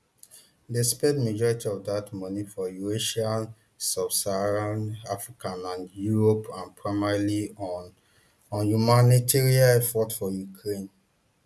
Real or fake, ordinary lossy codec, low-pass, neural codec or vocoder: real; none; none; none